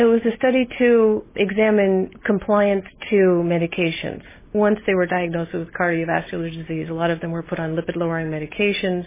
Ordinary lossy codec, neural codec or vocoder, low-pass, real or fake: MP3, 16 kbps; none; 3.6 kHz; real